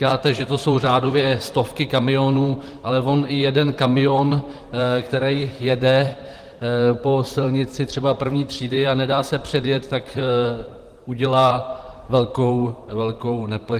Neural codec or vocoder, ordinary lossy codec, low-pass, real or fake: vocoder, 44.1 kHz, 128 mel bands, Pupu-Vocoder; Opus, 24 kbps; 14.4 kHz; fake